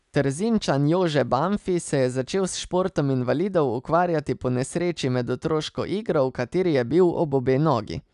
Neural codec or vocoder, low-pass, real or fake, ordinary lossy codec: none; 10.8 kHz; real; none